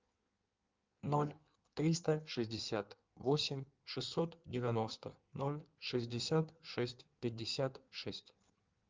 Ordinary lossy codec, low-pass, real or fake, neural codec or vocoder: Opus, 24 kbps; 7.2 kHz; fake; codec, 16 kHz in and 24 kHz out, 1.1 kbps, FireRedTTS-2 codec